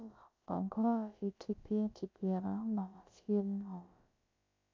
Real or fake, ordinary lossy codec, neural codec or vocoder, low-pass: fake; MP3, 64 kbps; codec, 16 kHz, about 1 kbps, DyCAST, with the encoder's durations; 7.2 kHz